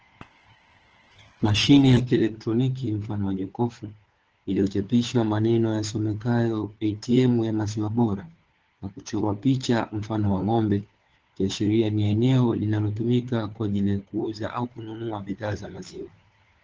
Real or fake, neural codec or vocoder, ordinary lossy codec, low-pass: fake; codec, 16 kHz, 4 kbps, FunCodec, trained on LibriTTS, 50 frames a second; Opus, 16 kbps; 7.2 kHz